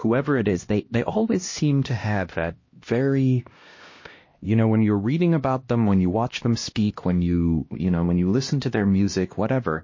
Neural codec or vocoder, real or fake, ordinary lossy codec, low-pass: codec, 16 kHz, 1 kbps, X-Codec, HuBERT features, trained on LibriSpeech; fake; MP3, 32 kbps; 7.2 kHz